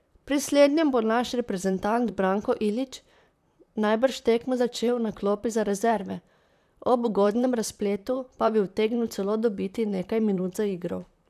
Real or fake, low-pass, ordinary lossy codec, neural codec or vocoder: fake; 14.4 kHz; none; vocoder, 44.1 kHz, 128 mel bands, Pupu-Vocoder